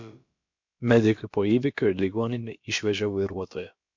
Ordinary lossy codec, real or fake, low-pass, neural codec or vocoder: MP3, 48 kbps; fake; 7.2 kHz; codec, 16 kHz, about 1 kbps, DyCAST, with the encoder's durations